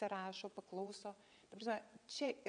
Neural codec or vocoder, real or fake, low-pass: vocoder, 22.05 kHz, 80 mel bands, WaveNeXt; fake; 9.9 kHz